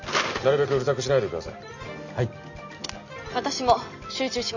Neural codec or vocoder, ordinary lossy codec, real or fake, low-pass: none; none; real; 7.2 kHz